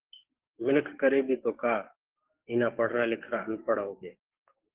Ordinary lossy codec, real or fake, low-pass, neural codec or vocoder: Opus, 16 kbps; fake; 3.6 kHz; codec, 44.1 kHz, 7.8 kbps, DAC